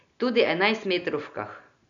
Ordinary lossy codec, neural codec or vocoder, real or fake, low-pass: none; none; real; 7.2 kHz